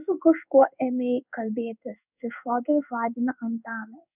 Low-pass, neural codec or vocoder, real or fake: 3.6 kHz; codec, 16 kHz in and 24 kHz out, 1 kbps, XY-Tokenizer; fake